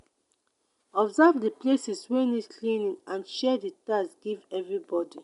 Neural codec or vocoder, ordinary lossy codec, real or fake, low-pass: none; MP3, 96 kbps; real; 10.8 kHz